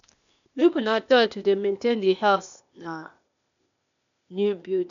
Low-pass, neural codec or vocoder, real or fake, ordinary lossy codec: 7.2 kHz; codec, 16 kHz, 0.8 kbps, ZipCodec; fake; none